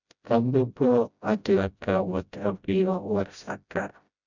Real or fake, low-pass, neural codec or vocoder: fake; 7.2 kHz; codec, 16 kHz, 0.5 kbps, FreqCodec, smaller model